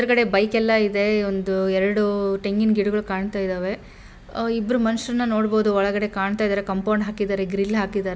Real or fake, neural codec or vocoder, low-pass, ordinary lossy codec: real; none; none; none